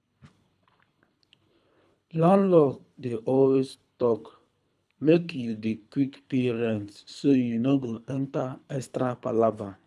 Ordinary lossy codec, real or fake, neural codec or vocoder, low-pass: none; fake; codec, 24 kHz, 3 kbps, HILCodec; none